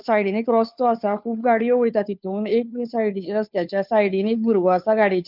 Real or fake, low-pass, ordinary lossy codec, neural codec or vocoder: fake; 5.4 kHz; none; codec, 16 kHz, 2 kbps, FunCodec, trained on Chinese and English, 25 frames a second